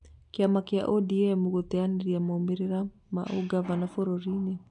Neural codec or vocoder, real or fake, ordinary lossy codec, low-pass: none; real; none; 10.8 kHz